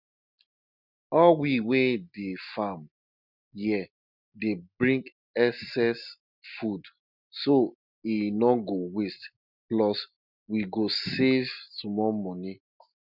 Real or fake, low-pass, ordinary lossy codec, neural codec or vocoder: real; 5.4 kHz; none; none